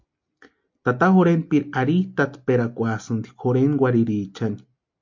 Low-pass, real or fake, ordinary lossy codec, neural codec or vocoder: 7.2 kHz; real; MP3, 48 kbps; none